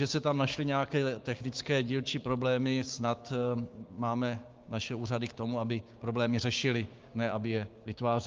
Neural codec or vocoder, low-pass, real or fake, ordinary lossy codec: codec, 16 kHz, 6 kbps, DAC; 7.2 kHz; fake; Opus, 24 kbps